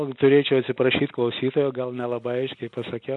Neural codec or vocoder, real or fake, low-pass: none; real; 10.8 kHz